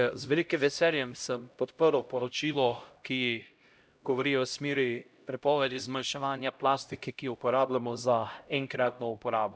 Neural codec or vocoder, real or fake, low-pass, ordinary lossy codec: codec, 16 kHz, 0.5 kbps, X-Codec, HuBERT features, trained on LibriSpeech; fake; none; none